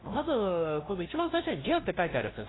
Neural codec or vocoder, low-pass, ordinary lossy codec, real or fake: codec, 16 kHz, 0.5 kbps, FunCodec, trained on LibriTTS, 25 frames a second; 7.2 kHz; AAC, 16 kbps; fake